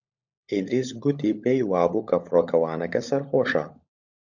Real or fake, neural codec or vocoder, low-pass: fake; codec, 16 kHz, 16 kbps, FunCodec, trained on LibriTTS, 50 frames a second; 7.2 kHz